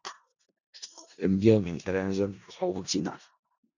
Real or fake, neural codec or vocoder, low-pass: fake; codec, 16 kHz in and 24 kHz out, 0.4 kbps, LongCat-Audio-Codec, four codebook decoder; 7.2 kHz